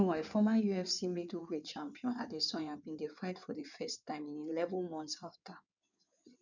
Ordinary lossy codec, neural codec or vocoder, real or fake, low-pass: none; codec, 16 kHz in and 24 kHz out, 2.2 kbps, FireRedTTS-2 codec; fake; 7.2 kHz